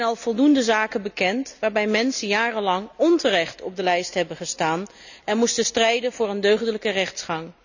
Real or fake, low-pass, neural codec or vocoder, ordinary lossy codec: real; 7.2 kHz; none; none